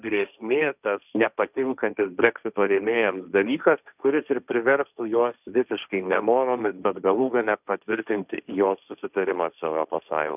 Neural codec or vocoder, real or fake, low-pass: codec, 16 kHz, 1.1 kbps, Voila-Tokenizer; fake; 3.6 kHz